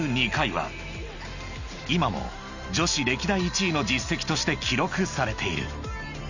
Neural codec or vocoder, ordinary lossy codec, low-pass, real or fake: none; none; 7.2 kHz; real